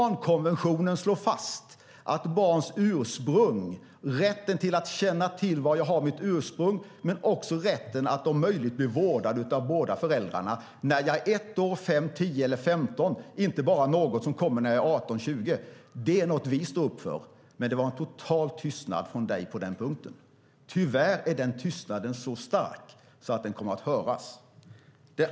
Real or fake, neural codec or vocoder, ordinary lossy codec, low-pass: real; none; none; none